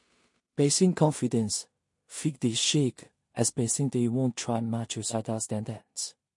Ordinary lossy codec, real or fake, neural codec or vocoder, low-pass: MP3, 48 kbps; fake; codec, 16 kHz in and 24 kHz out, 0.4 kbps, LongCat-Audio-Codec, two codebook decoder; 10.8 kHz